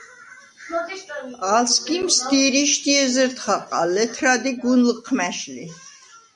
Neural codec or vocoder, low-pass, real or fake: none; 10.8 kHz; real